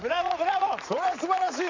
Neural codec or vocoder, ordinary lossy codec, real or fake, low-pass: vocoder, 44.1 kHz, 80 mel bands, Vocos; AAC, 48 kbps; fake; 7.2 kHz